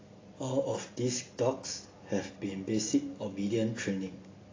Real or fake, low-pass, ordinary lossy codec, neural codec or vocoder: real; 7.2 kHz; AAC, 32 kbps; none